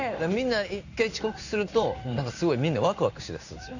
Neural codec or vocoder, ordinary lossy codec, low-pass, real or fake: codec, 16 kHz in and 24 kHz out, 1 kbps, XY-Tokenizer; none; 7.2 kHz; fake